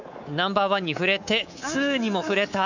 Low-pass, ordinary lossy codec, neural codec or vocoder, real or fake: 7.2 kHz; none; codec, 24 kHz, 3.1 kbps, DualCodec; fake